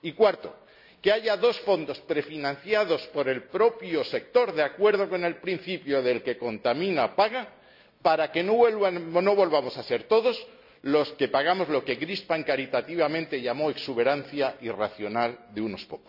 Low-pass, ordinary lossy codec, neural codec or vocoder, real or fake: 5.4 kHz; none; none; real